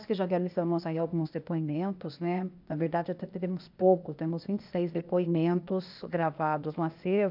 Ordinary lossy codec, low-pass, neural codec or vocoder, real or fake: none; 5.4 kHz; codec, 16 kHz, 0.8 kbps, ZipCodec; fake